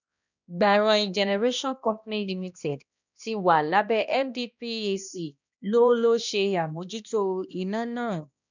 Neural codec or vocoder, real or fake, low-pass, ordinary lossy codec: codec, 16 kHz, 1 kbps, X-Codec, HuBERT features, trained on balanced general audio; fake; 7.2 kHz; none